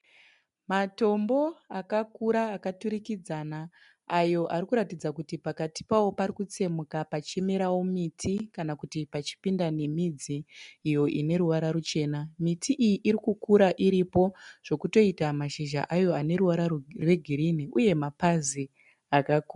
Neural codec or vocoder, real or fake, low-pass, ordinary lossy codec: none; real; 10.8 kHz; MP3, 64 kbps